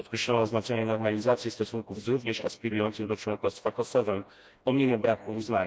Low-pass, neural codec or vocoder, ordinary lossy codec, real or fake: none; codec, 16 kHz, 1 kbps, FreqCodec, smaller model; none; fake